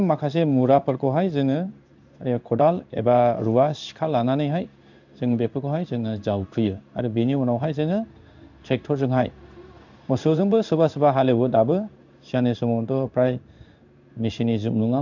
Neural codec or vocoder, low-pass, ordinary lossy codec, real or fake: codec, 16 kHz in and 24 kHz out, 1 kbps, XY-Tokenizer; 7.2 kHz; none; fake